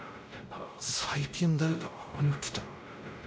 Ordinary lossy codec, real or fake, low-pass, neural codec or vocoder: none; fake; none; codec, 16 kHz, 0.5 kbps, X-Codec, WavLM features, trained on Multilingual LibriSpeech